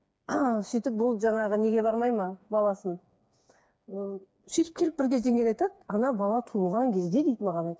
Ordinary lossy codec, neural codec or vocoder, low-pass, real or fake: none; codec, 16 kHz, 4 kbps, FreqCodec, smaller model; none; fake